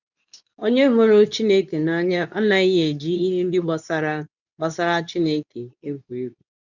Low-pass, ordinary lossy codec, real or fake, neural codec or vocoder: 7.2 kHz; none; fake; codec, 24 kHz, 0.9 kbps, WavTokenizer, medium speech release version 2